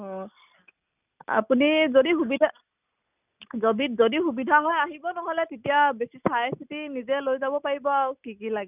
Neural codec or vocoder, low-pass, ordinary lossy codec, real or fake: none; 3.6 kHz; none; real